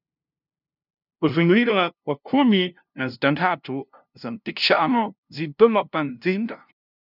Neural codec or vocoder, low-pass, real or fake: codec, 16 kHz, 0.5 kbps, FunCodec, trained on LibriTTS, 25 frames a second; 5.4 kHz; fake